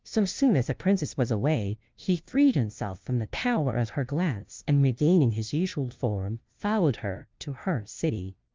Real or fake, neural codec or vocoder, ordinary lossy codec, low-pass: fake; codec, 16 kHz, 0.5 kbps, FunCodec, trained on LibriTTS, 25 frames a second; Opus, 32 kbps; 7.2 kHz